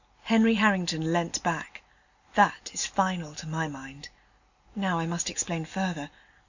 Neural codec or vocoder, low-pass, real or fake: none; 7.2 kHz; real